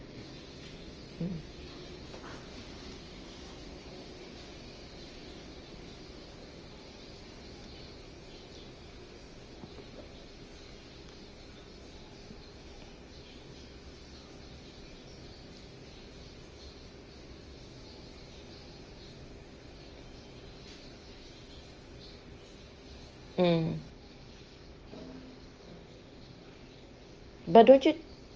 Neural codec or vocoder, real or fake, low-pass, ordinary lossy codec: none; real; 7.2 kHz; Opus, 24 kbps